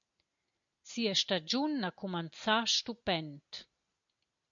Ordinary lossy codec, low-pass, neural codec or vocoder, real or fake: MP3, 48 kbps; 7.2 kHz; none; real